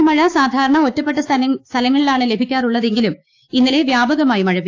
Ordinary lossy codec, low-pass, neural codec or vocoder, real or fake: AAC, 48 kbps; 7.2 kHz; codec, 16 kHz, 6 kbps, DAC; fake